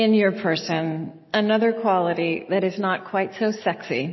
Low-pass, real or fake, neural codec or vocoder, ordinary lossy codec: 7.2 kHz; real; none; MP3, 24 kbps